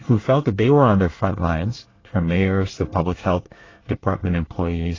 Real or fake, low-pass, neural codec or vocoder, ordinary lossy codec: fake; 7.2 kHz; codec, 24 kHz, 1 kbps, SNAC; AAC, 32 kbps